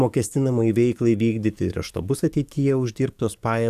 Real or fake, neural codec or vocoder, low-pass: fake; codec, 44.1 kHz, 7.8 kbps, DAC; 14.4 kHz